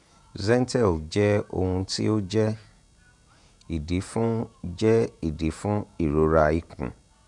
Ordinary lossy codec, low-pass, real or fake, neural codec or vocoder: none; 10.8 kHz; fake; vocoder, 48 kHz, 128 mel bands, Vocos